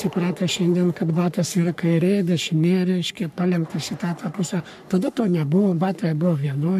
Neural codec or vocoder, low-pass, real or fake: codec, 44.1 kHz, 3.4 kbps, Pupu-Codec; 14.4 kHz; fake